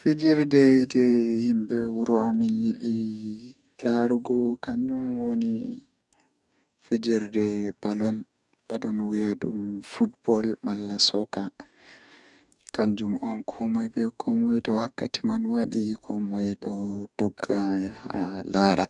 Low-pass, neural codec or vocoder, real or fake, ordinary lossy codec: 10.8 kHz; codec, 44.1 kHz, 2.6 kbps, DAC; fake; none